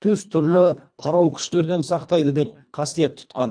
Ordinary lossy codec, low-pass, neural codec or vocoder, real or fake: none; 9.9 kHz; codec, 24 kHz, 1.5 kbps, HILCodec; fake